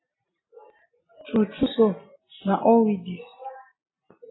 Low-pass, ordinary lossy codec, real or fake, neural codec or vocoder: 7.2 kHz; AAC, 16 kbps; real; none